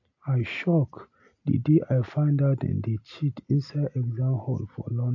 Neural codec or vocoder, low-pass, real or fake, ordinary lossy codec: none; 7.2 kHz; real; AAC, 48 kbps